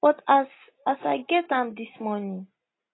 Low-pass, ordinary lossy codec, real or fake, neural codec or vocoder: 7.2 kHz; AAC, 16 kbps; real; none